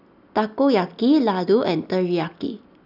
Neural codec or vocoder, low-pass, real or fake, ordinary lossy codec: none; 5.4 kHz; real; none